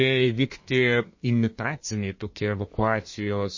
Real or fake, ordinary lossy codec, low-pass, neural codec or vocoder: fake; MP3, 32 kbps; 7.2 kHz; codec, 32 kHz, 1.9 kbps, SNAC